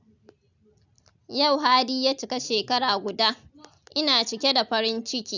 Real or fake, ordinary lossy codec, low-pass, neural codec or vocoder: real; none; 7.2 kHz; none